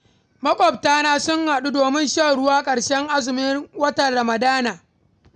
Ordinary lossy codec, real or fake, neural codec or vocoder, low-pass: none; real; none; 9.9 kHz